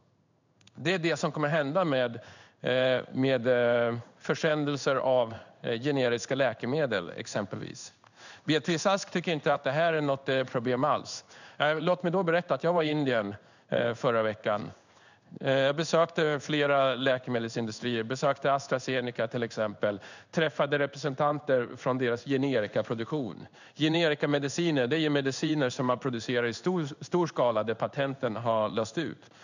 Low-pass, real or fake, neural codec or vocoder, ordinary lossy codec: 7.2 kHz; fake; codec, 16 kHz in and 24 kHz out, 1 kbps, XY-Tokenizer; none